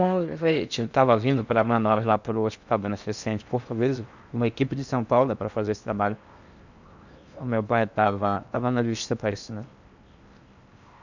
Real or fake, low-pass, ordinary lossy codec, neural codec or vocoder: fake; 7.2 kHz; none; codec, 16 kHz in and 24 kHz out, 0.8 kbps, FocalCodec, streaming, 65536 codes